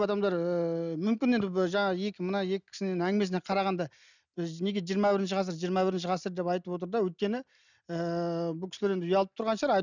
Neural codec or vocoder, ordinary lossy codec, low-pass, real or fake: none; none; 7.2 kHz; real